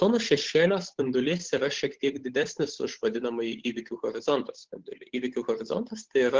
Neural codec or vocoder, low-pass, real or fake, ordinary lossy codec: codec, 16 kHz, 8 kbps, FunCodec, trained on Chinese and English, 25 frames a second; 7.2 kHz; fake; Opus, 16 kbps